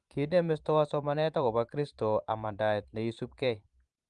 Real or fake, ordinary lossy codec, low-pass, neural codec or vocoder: fake; Opus, 24 kbps; 10.8 kHz; vocoder, 44.1 kHz, 128 mel bands every 512 samples, BigVGAN v2